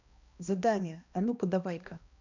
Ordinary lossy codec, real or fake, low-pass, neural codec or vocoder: none; fake; 7.2 kHz; codec, 16 kHz, 1 kbps, X-Codec, HuBERT features, trained on balanced general audio